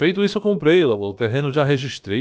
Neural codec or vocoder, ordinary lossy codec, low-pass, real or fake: codec, 16 kHz, about 1 kbps, DyCAST, with the encoder's durations; none; none; fake